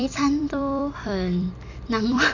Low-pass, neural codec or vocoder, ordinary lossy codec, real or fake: 7.2 kHz; vocoder, 44.1 kHz, 128 mel bands every 256 samples, BigVGAN v2; none; fake